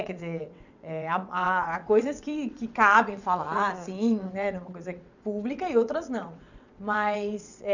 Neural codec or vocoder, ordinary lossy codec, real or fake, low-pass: vocoder, 22.05 kHz, 80 mel bands, WaveNeXt; none; fake; 7.2 kHz